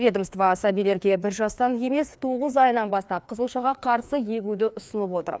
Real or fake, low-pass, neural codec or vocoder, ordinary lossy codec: fake; none; codec, 16 kHz, 2 kbps, FreqCodec, larger model; none